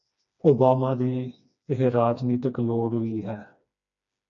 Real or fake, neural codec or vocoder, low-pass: fake; codec, 16 kHz, 2 kbps, FreqCodec, smaller model; 7.2 kHz